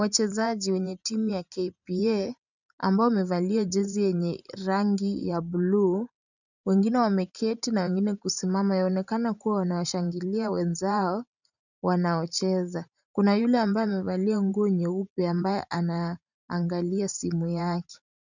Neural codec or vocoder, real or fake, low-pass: vocoder, 44.1 kHz, 128 mel bands every 512 samples, BigVGAN v2; fake; 7.2 kHz